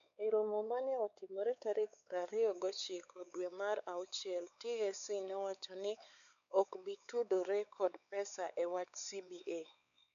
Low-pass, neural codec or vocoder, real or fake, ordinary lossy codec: 7.2 kHz; codec, 16 kHz, 4 kbps, X-Codec, WavLM features, trained on Multilingual LibriSpeech; fake; none